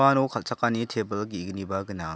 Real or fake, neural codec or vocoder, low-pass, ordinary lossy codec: real; none; none; none